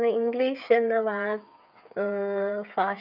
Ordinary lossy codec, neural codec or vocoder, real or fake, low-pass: none; codec, 16 kHz, 8 kbps, FreqCodec, smaller model; fake; 5.4 kHz